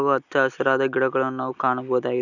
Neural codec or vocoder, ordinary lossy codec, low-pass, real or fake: none; none; 7.2 kHz; real